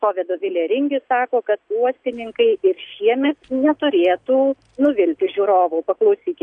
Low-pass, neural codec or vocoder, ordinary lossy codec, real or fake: 9.9 kHz; none; MP3, 64 kbps; real